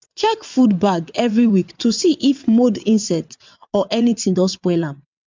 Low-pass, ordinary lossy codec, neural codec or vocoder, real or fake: 7.2 kHz; MP3, 64 kbps; vocoder, 22.05 kHz, 80 mel bands, WaveNeXt; fake